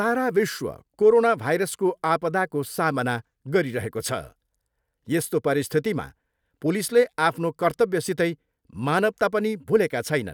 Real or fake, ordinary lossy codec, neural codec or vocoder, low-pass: real; none; none; none